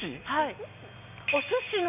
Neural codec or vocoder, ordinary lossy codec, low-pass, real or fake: none; none; 3.6 kHz; real